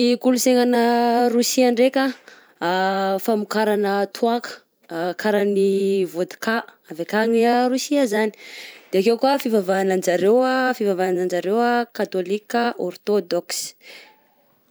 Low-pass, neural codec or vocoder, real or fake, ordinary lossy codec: none; vocoder, 44.1 kHz, 128 mel bands every 512 samples, BigVGAN v2; fake; none